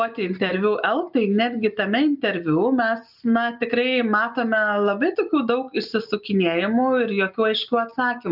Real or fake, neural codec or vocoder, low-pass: real; none; 5.4 kHz